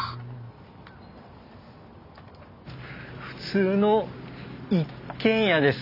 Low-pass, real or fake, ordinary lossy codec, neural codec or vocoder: 5.4 kHz; real; none; none